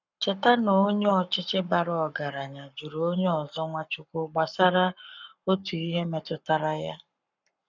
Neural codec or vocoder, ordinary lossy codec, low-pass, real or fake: codec, 44.1 kHz, 7.8 kbps, Pupu-Codec; none; 7.2 kHz; fake